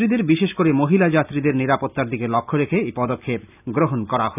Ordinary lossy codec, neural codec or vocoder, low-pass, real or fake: none; none; 3.6 kHz; real